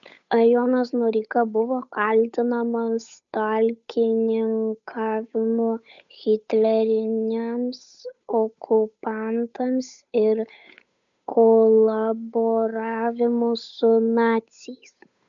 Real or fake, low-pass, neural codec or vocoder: fake; 7.2 kHz; codec, 16 kHz, 8 kbps, FunCodec, trained on Chinese and English, 25 frames a second